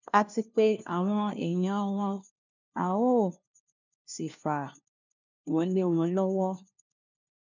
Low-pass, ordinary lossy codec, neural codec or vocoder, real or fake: 7.2 kHz; none; codec, 16 kHz, 1 kbps, FunCodec, trained on LibriTTS, 50 frames a second; fake